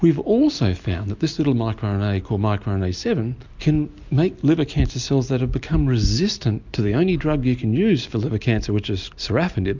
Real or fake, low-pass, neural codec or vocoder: real; 7.2 kHz; none